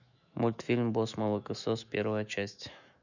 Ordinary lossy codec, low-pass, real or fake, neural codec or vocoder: none; 7.2 kHz; fake; autoencoder, 48 kHz, 128 numbers a frame, DAC-VAE, trained on Japanese speech